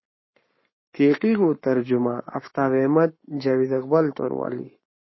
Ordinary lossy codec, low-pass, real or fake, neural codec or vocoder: MP3, 24 kbps; 7.2 kHz; fake; codec, 44.1 kHz, 7.8 kbps, Pupu-Codec